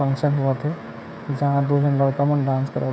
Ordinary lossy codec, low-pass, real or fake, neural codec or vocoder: none; none; fake; codec, 16 kHz, 16 kbps, FreqCodec, smaller model